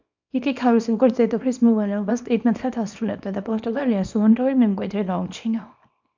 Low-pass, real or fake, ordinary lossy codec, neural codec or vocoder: 7.2 kHz; fake; MP3, 64 kbps; codec, 24 kHz, 0.9 kbps, WavTokenizer, small release